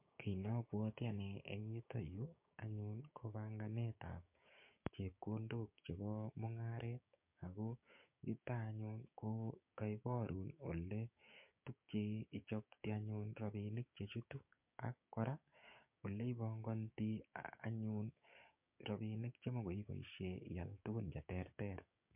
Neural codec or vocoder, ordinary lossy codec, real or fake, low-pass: codec, 16 kHz, 6 kbps, DAC; MP3, 32 kbps; fake; 3.6 kHz